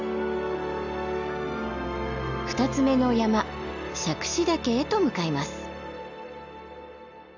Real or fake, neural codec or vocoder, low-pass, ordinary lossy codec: real; none; 7.2 kHz; none